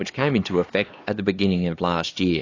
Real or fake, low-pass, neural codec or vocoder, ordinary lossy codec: fake; 7.2 kHz; codec, 16 kHz, 4 kbps, X-Codec, HuBERT features, trained on LibriSpeech; AAC, 32 kbps